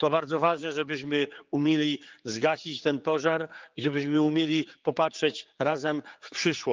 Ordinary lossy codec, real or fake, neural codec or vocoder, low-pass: Opus, 32 kbps; fake; codec, 16 kHz, 4 kbps, X-Codec, HuBERT features, trained on general audio; 7.2 kHz